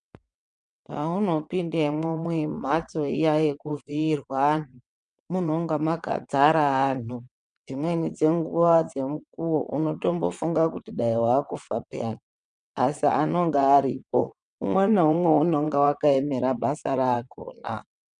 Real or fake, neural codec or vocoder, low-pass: fake; vocoder, 22.05 kHz, 80 mel bands, WaveNeXt; 9.9 kHz